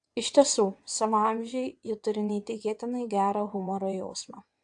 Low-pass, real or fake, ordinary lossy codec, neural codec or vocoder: 9.9 kHz; fake; AAC, 64 kbps; vocoder, 22.05 kHz, 80 mel bands, WaveNeXt